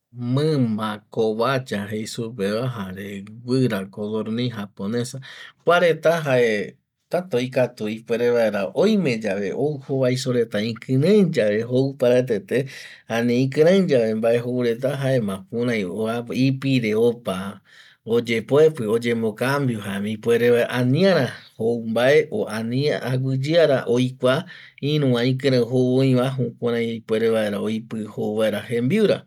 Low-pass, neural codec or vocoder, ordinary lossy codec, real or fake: 19.8 kHz; none; none; real